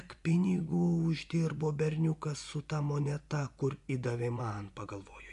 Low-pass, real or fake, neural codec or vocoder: 10.8 kHz; fake; vocoder, 24 kHz, 100 mel bands, Vocos